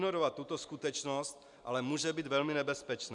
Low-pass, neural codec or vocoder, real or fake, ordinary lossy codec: 10.8 kHz; none; real; MP3, 96 kbps